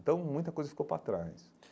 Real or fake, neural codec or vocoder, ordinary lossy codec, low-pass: real; none; none; none